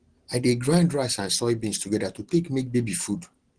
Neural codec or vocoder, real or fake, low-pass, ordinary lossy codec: none; real; 9.9 kHz; Opus, 16 kbps